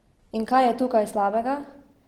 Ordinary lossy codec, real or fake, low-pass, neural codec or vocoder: Opus, 16 kbps; real; 19.8 kHz; none